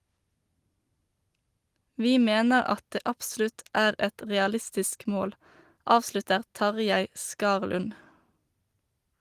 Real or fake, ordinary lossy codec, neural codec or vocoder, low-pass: real; Opus, 24 kbps; none; 14.4 kHz